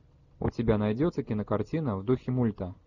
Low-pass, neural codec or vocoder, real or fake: 7.2 kHz; none; real